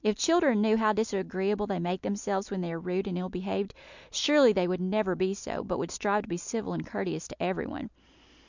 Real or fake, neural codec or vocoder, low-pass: real; none; 7.2 kHz